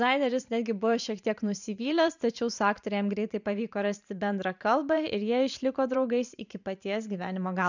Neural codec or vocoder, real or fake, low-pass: vocoder, 44.1 kHz, 128 mel bands every 512 samples, BigVGAN v2; fake; 7.2 kHz